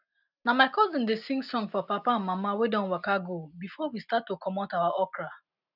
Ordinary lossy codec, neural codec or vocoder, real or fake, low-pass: none; none; real; 5.4 kHz